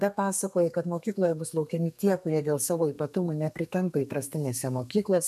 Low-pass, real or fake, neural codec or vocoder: 14.4 kHz; fake; codec, 32 kHz, 1.9 kbps, SNAC